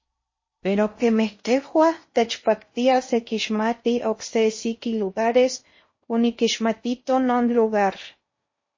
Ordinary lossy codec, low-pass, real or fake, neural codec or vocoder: MP3, 32 kbps; 7.2 kHz; fake; codec, 16 kHz in and 24 kHz out, 0.6 kbps, FocalCodec, streaming, 4096 codes